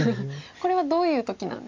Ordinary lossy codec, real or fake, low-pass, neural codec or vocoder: MP3, 48 kbps; real; 7.2 kHz; none